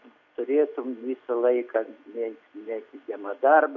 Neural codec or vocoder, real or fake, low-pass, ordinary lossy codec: none; real; 7.2 kHz; MP3, 48 kbps